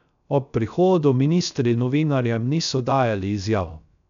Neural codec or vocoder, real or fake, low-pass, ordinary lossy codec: codec, 16 kHz, 0.3 kbps, FocalCodec; fake; 7.2 kHz; none